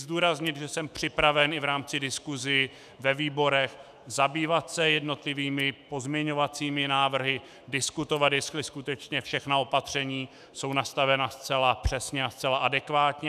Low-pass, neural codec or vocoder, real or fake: 14.4 kHz; autoencoder, 48 kHz, 128 numbers a frame, DAC-VAE, trained on Japanese speech; fake